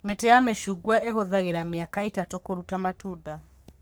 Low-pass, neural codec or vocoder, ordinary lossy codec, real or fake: none; codec, 44.1 kHz, 3.4 kbps, Pupu-Codec; none; fake